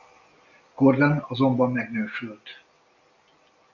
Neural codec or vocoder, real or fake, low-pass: none; real; 7.2 kHz